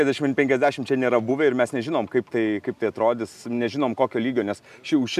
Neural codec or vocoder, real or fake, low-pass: none; real; 14.4 kHz